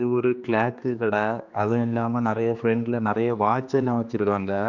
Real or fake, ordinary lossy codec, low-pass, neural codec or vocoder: fake; none; 7.2 kHz; codec, 16 kHz, 2 kbps, X-Codec, HuBERT features, trained on general audio